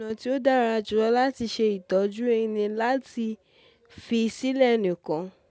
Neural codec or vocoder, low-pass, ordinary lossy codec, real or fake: none; none; none; real